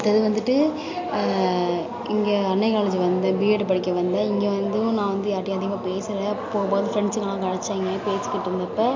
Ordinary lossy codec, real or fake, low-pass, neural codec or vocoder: MP3, 48 kbps; real; 7.2 kHz; none